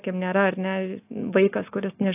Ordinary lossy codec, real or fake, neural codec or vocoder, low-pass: AAC, 24 kbps; real; none; 3.6 kHz